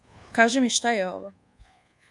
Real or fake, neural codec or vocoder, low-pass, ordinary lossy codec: fake; codec, 24 kHz, 1.2 kbps, DualCodec; 10.8 kHz; MP3, 96 kbps